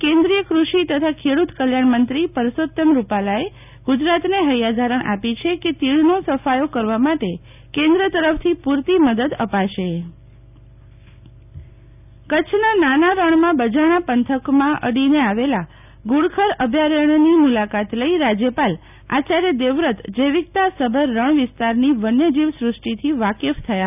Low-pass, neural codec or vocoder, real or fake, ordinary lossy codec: 3.6 kHz; none; real; none